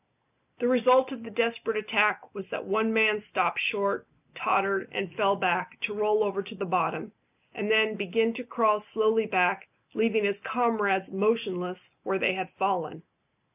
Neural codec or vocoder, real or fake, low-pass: none; real; 3.6 kHz